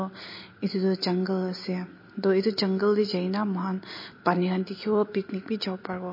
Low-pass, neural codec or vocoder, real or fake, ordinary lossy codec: 5.4 kHz; none; real; MP3, 24 kbps